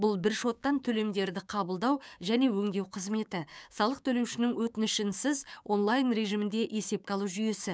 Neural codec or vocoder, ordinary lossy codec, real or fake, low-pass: codec, 16 kHz, 6 kbps, DAC; none; fake; none